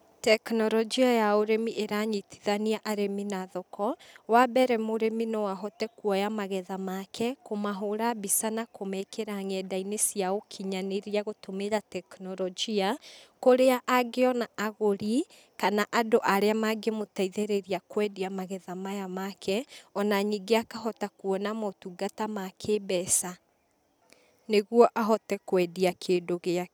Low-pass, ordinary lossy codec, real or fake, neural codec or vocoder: none; none; real; none